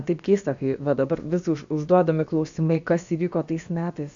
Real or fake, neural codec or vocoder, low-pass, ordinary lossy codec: fake; codec, 16 kHz, about 1 kbps, DyCAST, with the encoder's durations; 7.2 kHz; AAC, 64 kbps